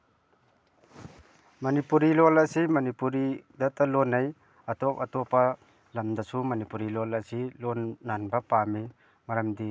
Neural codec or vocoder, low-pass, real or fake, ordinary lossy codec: none; none; real; none